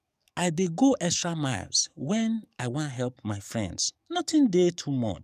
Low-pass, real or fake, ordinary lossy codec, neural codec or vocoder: 14.4 kHz; fake; none; codec, 44.1 kHz, 7.8 kbps, DAC